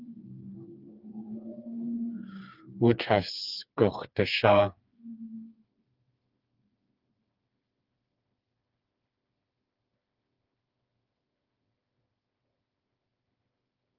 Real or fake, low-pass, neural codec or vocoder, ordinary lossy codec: fake; 5.4 kHz; codec, 16 kHz, 4 kbps, FreqCodec, smaller model; Opus, 24 kbps